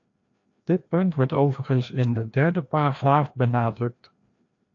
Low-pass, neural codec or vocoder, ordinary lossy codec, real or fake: 7.2 kHz; codec, 16 kHz, 1 kbps, FreqCodec, larger model; AAC, 48 kbps; fake